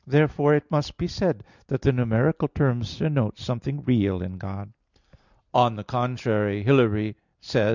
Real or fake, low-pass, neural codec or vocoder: real; 7.2 kHz; none